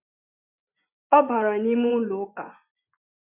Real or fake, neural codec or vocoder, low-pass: fake; vocoder, 24 kHz, 100 mel bands, Vocos; 3.6 kHz